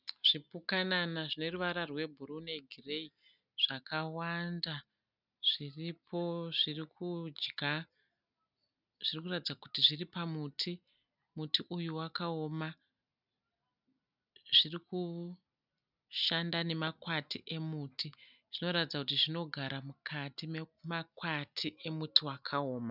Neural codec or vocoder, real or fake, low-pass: none; real; 5.4 kHz